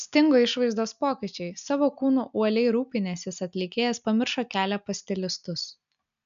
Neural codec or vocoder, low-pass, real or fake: none; 7.2 kHz; real